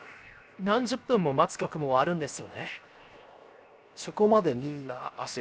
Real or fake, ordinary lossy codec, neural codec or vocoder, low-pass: fake; none; codec, 16 kHz, 0.7 kbps, FocalCodec; none